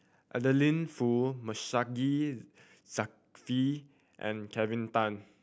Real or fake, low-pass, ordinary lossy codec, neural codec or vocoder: real; none; none; none